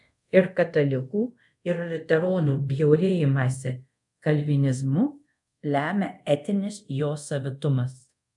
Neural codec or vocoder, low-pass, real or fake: codec, 24 kHz, 0.5 kbps, DualCodec; 10.8 kHz; fake